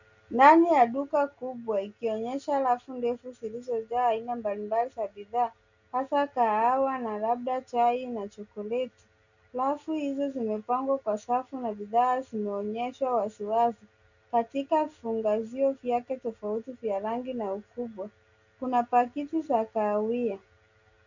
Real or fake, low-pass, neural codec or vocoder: real; 7.2 kHz; none